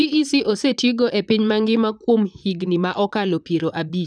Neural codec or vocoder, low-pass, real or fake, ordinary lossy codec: vocoder, 44.1 kHz, 128 mel bands, Pupu-Vocoder; 9.9 kHz; fake; none